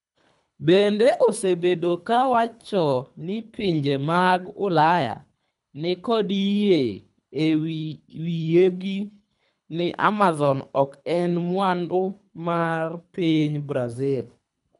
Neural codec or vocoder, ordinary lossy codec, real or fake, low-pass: codec, 24 kHz, 3 kbps, HILCodec; none; fake; 10.8 kHz